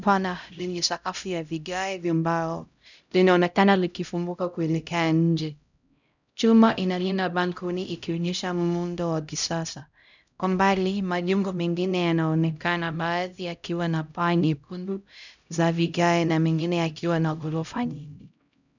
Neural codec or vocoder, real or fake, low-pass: codec, 16 kHz, 0.5 kbps, X-Codec, HuBERT features, trained on LibriSpeech; fake; 7.2 kHz